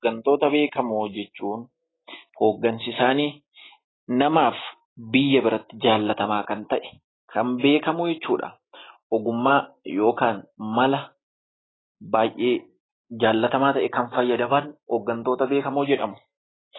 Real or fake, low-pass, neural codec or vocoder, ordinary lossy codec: real; 7.2 kHz; none; AAC, 16 kbps